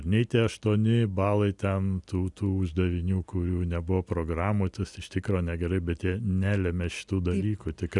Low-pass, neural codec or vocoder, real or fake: 10.8 kHz; none; real